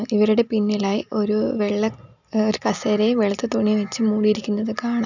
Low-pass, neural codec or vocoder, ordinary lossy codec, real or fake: 7.2 kHz; none; none; real